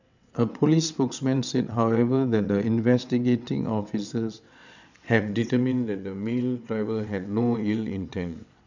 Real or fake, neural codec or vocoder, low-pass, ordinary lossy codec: fake; vocoder, 22.05 kHz, 80 mel bands, WaveNeXt; 7.2 kHz; none